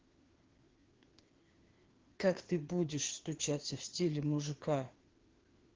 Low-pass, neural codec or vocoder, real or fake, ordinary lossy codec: 7.2 kHz; codec, 16 kHz, 2 kbps, FreqCodec, larger model; fake; Opus, 16 kbps